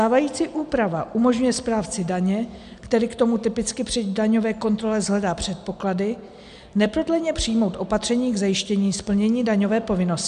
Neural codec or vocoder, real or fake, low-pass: none; real; 10.8 kHz